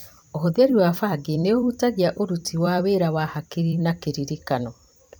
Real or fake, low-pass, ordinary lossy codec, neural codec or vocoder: fake; none; none; vocoder, 44.1 kHz, 128 mel bands every 256 samples, BigVGAN v2